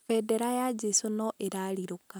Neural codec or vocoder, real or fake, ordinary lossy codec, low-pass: none; real; none; none